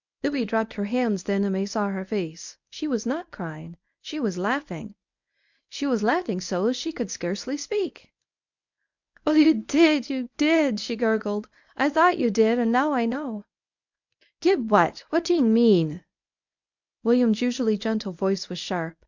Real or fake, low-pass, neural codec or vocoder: fake; 7.2 kHz; codec, 24 kHz, 0.9 kbps, WavTokenizer, medium speech release version 1